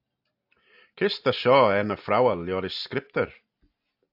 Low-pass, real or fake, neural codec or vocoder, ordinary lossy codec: 5.4 kHz; real; none; AAC, 48 kbps